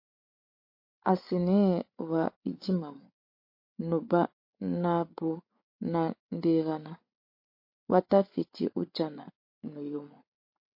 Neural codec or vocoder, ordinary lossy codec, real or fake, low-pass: codec, 16 kHz, 8 kbps, FreqCodec, larger model; MP3, 32 kbps; fake; 5.4 kHz